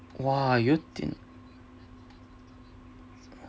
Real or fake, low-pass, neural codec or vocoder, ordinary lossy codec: real; none; none; none